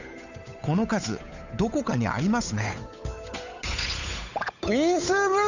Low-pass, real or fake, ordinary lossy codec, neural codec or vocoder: 7.2 kHz; fake; none; codec, 16 kHz, 8 kbps, FunCodec, trained on Chinese and English, 25 frames a second